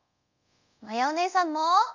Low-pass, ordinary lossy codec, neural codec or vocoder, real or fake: 7.2 kHz; none; codec, 24 kHz, 0.5 kbps, DualCodec; fake